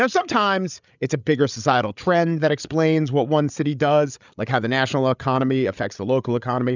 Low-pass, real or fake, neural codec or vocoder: 7.2 kHz; fake; codec, 16 kHz, 8 kbps, FreqCodec, larger model